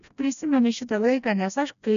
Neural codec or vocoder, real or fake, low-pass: codec, 16 kHz, 1 kbps, FreqCodec, smaller model; fake; 7.2 kHz